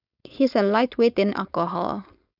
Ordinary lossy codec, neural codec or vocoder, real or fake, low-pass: none; codec, 16 kHz, 4.8 kbps, FACodec; fake; 5.4 kHz